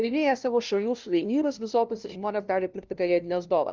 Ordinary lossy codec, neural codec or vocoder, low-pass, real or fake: Opus, 24 kbps; codec, 16 kHz, 0.5 kbps, FunCodec, trained on LibriTTS, 25 frames a second; 7.2 kHz; fake